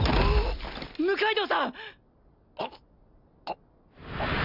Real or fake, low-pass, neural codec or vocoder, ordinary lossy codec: real; 5.4 kHz; none; none